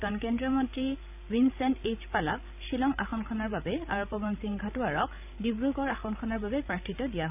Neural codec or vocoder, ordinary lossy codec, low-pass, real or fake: codec, 16 kHz, 16 kbps, FreqCodec, larger model; none; 3.6 kHz; fake